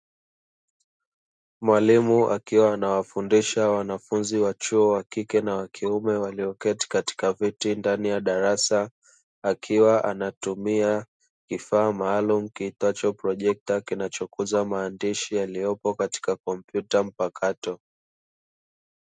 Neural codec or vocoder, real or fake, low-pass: none; real; 9.9 kHz